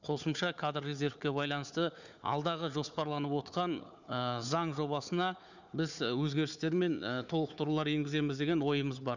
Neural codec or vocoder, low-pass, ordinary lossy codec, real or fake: codec, 16 kHz, 4 kbps, FunCodec, trained on Chinese and English, 50 frames a second; 7.2 kHz; none; fake